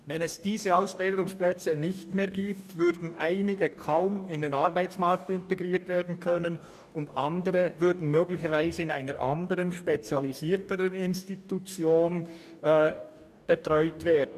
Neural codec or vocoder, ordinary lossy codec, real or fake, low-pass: codec, 44.1 kHz, 2.6 kbps, DAC; none; fake; 14.4 kHz